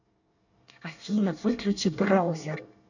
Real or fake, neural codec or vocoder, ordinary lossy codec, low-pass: fake; codec, 24 kHz, 1 kbps, SNAC; none; 7.2 kHz